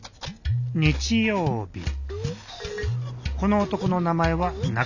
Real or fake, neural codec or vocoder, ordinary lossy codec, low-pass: real; none; none; 7.2 kHz